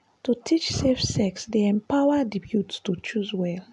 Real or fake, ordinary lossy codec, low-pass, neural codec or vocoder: real; AAC, 96 kbps; 10.8 kHz; none